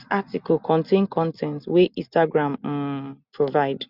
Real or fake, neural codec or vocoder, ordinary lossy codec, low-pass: real; none; none; 5.4 kHz